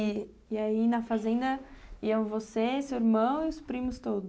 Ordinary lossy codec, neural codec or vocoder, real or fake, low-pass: none; none; real; none